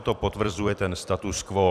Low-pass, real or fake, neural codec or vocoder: 14.4 kHz; fake; vocoder, 44.1 kHz, 128 mel bands every 256 samples, BigVGAN v2